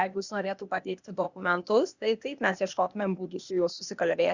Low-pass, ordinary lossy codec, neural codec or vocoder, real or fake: 7.2 kHz; Opus, 64 kbps; codec, 16 kHz, 0.8 kbps, ZipCodec; fake